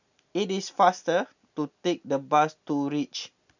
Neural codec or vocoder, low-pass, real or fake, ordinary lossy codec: none; 7.2 kHz; real; none